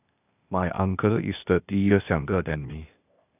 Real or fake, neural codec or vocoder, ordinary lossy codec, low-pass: fake; codec, 16 kHz, 0.8 kbps, ZipCodec; none; 3.6 kHz